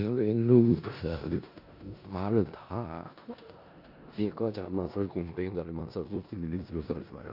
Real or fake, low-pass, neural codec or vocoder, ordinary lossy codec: fake; 5.4 kHz; codec, 16 kHz in and 24 kHz out, 0.4 kbps, LongCat-Audio-Codec, four codebook decoder; AAC, 48 kbps